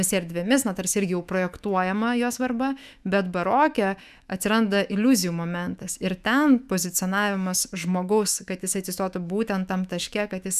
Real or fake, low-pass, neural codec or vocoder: fake; 14.4 kHz; autoencoder, 48 kHz, 128 numbers a frame, DAC-VAE, trained on Japanese speech